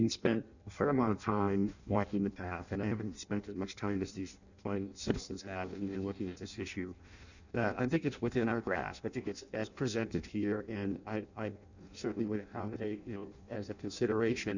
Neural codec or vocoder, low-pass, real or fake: codec, 16 kHz in and 24 kHz out, 0.6 kbps, FireRedTTS-2 codec; 7.2 kHz; fake